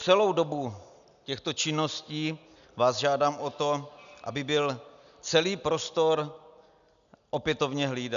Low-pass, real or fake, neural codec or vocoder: 7.2 kHz; real; none